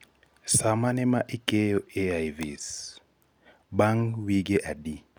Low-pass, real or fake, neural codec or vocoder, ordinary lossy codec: none; real; none; none